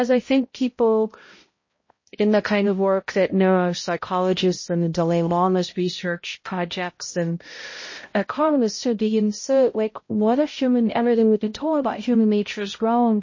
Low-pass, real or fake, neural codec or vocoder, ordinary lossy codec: 7.2 kHz; fake; codec, 16 kHz, 0.5 kbps, X-Codec, HuBERT features, trained on balanced general audio; MP3, 32 kbps